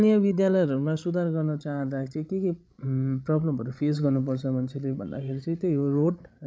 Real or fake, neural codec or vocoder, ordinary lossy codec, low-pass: fake; codec, 16 kHz, 16 kbps, FreqCodec, larger model; none; none